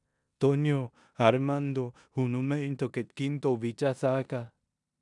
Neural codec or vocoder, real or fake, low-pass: codec, 16 kHz in and 24 kHz out, 0.9 kbps, LongCat-Audio-Codec, four codebook decoder; fake; 10.8 kHz